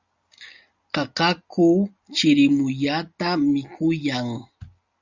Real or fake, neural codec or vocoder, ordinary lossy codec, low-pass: real; none; Opus, 64 kbps; 7.2 kHz